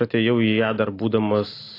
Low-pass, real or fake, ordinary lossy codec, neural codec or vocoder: 5.4 kHz; real; AAC, 24 kbps; none